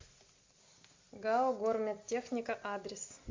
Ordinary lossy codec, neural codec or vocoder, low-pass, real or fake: MP3, 48 kbps; none; 7.2 kHz; real